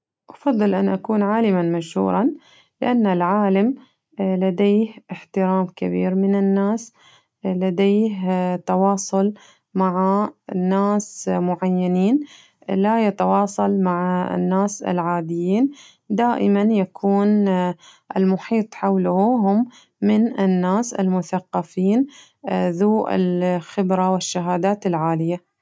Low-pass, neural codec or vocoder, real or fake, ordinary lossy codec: none; none; real; none